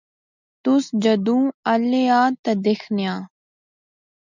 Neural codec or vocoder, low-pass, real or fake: none; 7.2 kHz; real